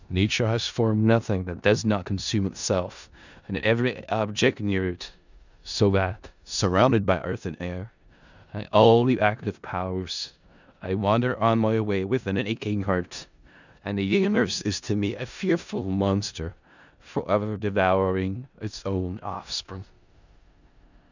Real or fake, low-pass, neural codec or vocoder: fake; 7.2 kHz; codec, 16 kHz in and 24 kHz out, 0.4 kbps, LongCat-Audio-Codec, four codebook decoder